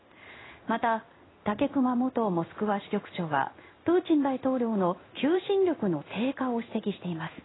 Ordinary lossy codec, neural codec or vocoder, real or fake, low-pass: AAC, 16 kbps; codec, 16 kHz in and 24 kHz out, 1 kbps, XY-Tokenizer; fake; 7.2 kHz